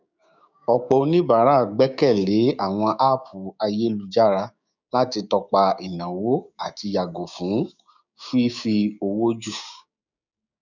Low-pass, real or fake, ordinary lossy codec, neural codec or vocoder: 7.2 kHz; fake; none; codec, 16 kHz, 6 kbps, DAC